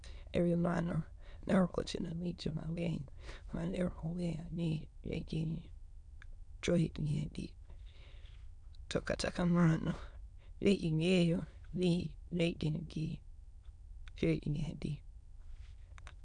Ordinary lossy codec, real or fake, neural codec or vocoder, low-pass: none; fake; autoencoder, 22.05 kHz, a latent of 192 numbers a frame, VITS, trained on many speakers; 9.9 kHz